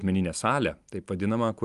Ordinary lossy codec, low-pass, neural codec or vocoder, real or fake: Opus, 32 kbps; 10.8 kHz; none; real